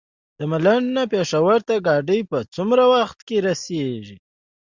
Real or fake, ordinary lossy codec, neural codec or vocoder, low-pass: real; Opus, 64 kbps; none; 7.2 kHz